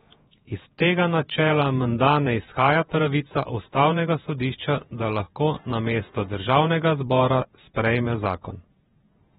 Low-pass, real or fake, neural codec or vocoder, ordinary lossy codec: 19.8 kHz; fake; vocoder, 48 kHz, 128 mel bands, Vocos; AAC, 16 kbps